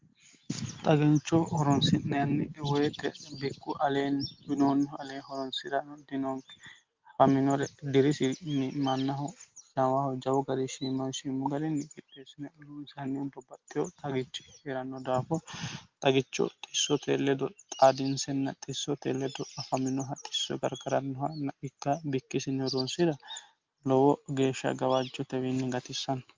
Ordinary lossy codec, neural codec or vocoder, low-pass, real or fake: Opus, 32 kbps; none; 7.2 kHz; real